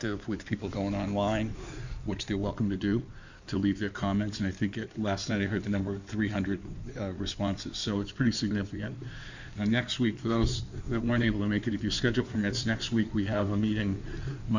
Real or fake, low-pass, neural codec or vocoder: fake; 7.2 kHz; codec, 16 kHz in and 24 kHz out, 2.2 kbps, FireRedTTS-2 codec